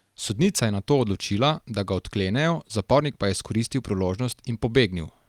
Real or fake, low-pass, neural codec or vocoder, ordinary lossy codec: real; 19.8 kHz; none; Opus, 32 kbps